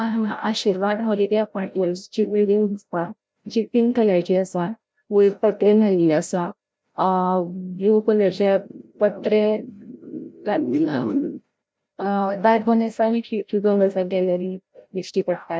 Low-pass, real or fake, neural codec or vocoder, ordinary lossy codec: none; fake; codec, 16 kHz, 0.5 kbps, FreqCodec, larger model; none